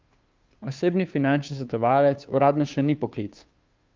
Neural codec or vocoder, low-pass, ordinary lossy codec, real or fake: codec, 16 kHz, 2 kbps, FunCodec, trained on Chinese and English, 25 frames a second; 7.2 kHz; Opus, 24 kbps; fake